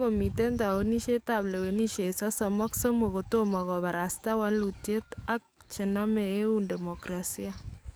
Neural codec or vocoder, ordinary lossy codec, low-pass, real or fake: codec, 44.1 kHz, 7.8 kbps, DAC; none; none; fake